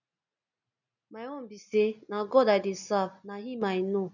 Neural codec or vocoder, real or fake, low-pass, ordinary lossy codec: none; real; 7.2 kHz; none